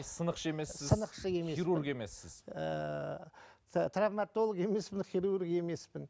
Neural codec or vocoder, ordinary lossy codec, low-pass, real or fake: none; none; none; real